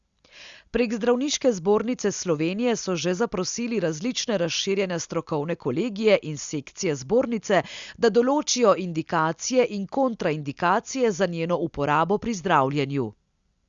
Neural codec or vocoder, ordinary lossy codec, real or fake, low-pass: none; Opus, 64 kbps; real; 7.2 kHz